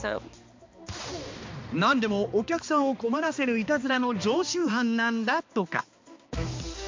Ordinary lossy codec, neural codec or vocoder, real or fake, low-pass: MP3, 48 kbps; codec, 16 kHz, 2 kbps, X-Codec, HuBERT features, trained on balanced general audio; fake; 7.2 kHz